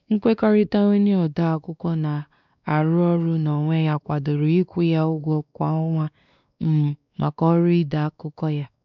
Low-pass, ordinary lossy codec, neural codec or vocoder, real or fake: 7.2 kHz; none; codec, 16 kHz, 2 kbps, X-Codec, WavLM features, trained on Multilingual LibriSpeech; fake